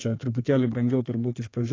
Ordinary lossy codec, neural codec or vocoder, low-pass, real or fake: AAC, 32 kbps; codec, 32 kHz, 1.9 kbps, SNAC; 7.2 kHz; fake